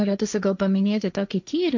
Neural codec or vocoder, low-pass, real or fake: codec, 16 kHz, 1.1 kbps, Voila-Tokenizer; 7.2 kHz; fake